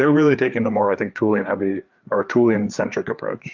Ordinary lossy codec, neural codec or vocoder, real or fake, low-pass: Opus, 24 kbps; codec, 16 kHz, 4 kbps, FreqCodec, larger model; fake; 7.2 kHz